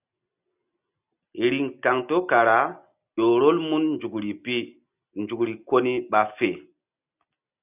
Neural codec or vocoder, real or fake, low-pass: none; real; 3.6 kHz